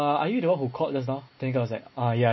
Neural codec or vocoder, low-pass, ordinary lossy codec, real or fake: none; 7.2 kHz; MP3, 24 kbps; real